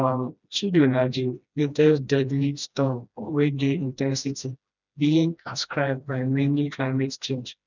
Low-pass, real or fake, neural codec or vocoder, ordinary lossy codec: 7.2 kHz; fake; codec, 16 kHz, 1 kbps, FreqCodec, smaller model; none